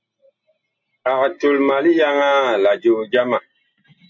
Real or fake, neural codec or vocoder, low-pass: real; none; 7.2 kHz